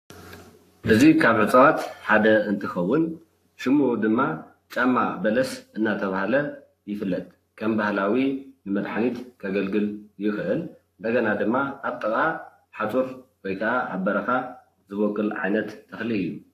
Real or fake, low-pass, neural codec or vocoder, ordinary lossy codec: fake; 14.4 kHz; codec, 44.1 kHz, 7.8 kbps, Pupu-Codec; AAC, 64 kbps